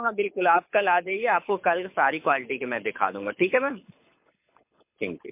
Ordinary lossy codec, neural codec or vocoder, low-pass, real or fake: MP3, 32 kbps; codec, 24 kHz, 6 kbps, HILCodec; 3.6 kHz; fake